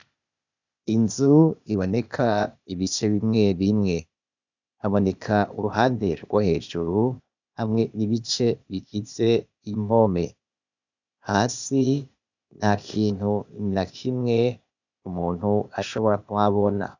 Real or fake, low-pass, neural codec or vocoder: fake; 7.2 kHz; codec, 16 kHz, 0.8 kbps, ZipCodec